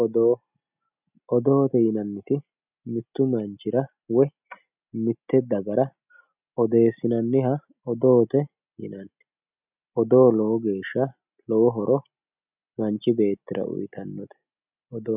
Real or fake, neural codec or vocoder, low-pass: real; none; 3.6 kHz